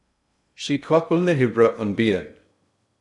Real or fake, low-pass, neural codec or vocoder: fake; 10.8 kHz; codec, 16 kHz in and 24 kHz out, 0.6 kbps, FocalCodec, streaming, 2048 codes